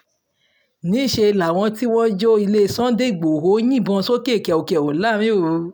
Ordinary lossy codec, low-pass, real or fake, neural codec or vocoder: none; none; real; none